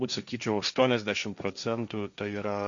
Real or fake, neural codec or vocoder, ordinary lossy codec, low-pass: fake; codec, 16 kHz, 1.1 kbps, Voila-Tokenizer; Opus, 64 kbps; 7.2 kHz